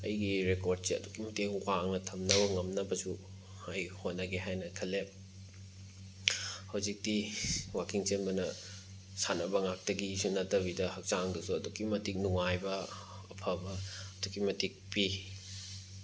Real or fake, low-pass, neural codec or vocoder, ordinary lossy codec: real; none; none; none